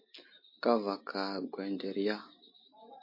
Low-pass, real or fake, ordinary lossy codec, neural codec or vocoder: 5.4 kHz; real; MP3, 32 kbps; none